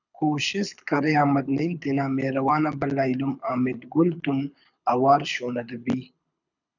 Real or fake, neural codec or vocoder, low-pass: fake; codec, 24 kHz, 6 kbps, HILCodec; 7.2 kHz